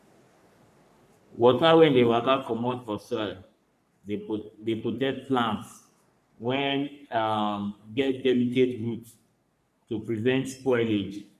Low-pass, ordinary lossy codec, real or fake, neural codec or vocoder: 14.4 kHz; none; fake; codec, 44.1 kHz, 3.4 kbps, Pupu-Codec